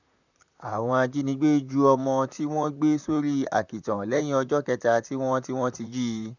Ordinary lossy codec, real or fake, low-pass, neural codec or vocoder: none; fake; 7.2 kHz; vocoder, 44.1 kHz, 128 mel bands, Pupu-Vocoder